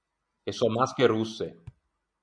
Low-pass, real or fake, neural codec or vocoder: 9.9 kHz; real; none